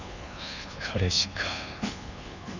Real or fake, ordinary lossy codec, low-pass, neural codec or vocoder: fake; none; 7.2 kHz; codec, 24 kHz, 1.2 kbps, DualCodec